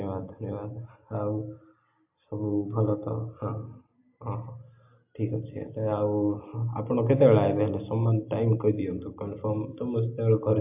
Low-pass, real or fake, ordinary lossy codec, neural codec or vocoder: 3.6 kHz; real; MP3, 32 kbps; none